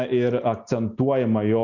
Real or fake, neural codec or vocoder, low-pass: real; none; 7.2 kHz